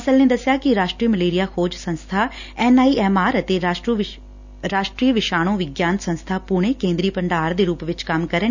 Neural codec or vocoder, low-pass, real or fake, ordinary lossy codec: none; 7.2 kHz; real; none